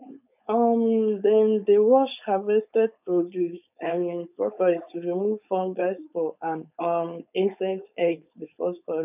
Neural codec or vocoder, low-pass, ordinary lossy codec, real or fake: codec, 16 kHz, 4.8 kbps, FACodec; 3.6 kHz; none; fake